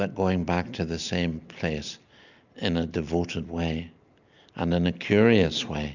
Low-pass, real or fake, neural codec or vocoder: 7.2 kHz; real; none